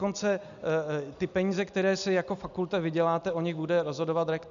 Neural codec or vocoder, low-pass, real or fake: none; 7.2 kHz; real